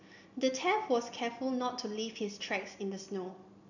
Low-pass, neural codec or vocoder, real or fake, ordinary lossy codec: 7.2 kHz; none; real; none